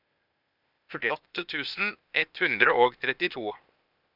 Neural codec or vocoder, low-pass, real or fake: codec, 16 kHz, 0.8 kbps, ZipCodec; 5.4 kHz; fake